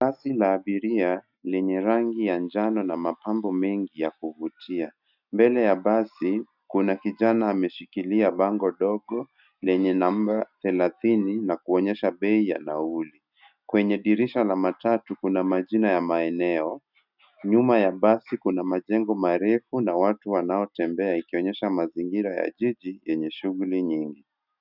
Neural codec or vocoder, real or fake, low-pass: none; real; 5.4 kHz